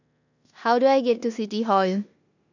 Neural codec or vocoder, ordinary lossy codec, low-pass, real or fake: codec, 16 kHz in and 24 kHz out, 0.9 kbps, LongCat-Audio-Codec, four codebook decoder; none; 7.2 kHz; fake